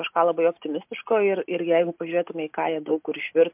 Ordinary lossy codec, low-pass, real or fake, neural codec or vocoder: MP3, 32 kbps; 3.6 kHz; real; none